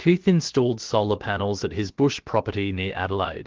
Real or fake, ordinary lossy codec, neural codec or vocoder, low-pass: fake; Opus, 16 kbps; codec, 16 kHz, about 1 kbps, DyCAST, with the encoder's durations; 7.2 kHz